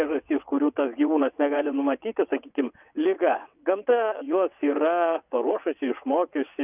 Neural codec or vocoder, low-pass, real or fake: vocoder, 22.05 kHz, 80 mel bands, WaveNeXt; 3.6 kHz; fake